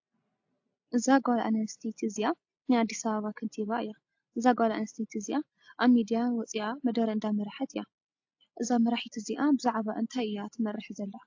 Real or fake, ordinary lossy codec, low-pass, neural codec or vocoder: real; AAC, 48 kbps; 7.2 kHz; none